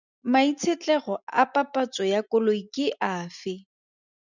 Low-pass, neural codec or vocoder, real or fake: 7.2 kHz; none; real